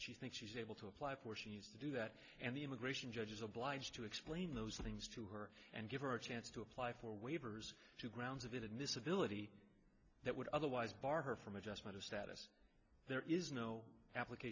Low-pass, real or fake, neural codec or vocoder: 7.2 kHz; real; none